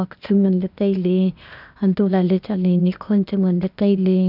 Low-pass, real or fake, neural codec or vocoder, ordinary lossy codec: 5.4 kHz; fake; codec, 16 kHz, 0.8 kbps, ZipCodec; none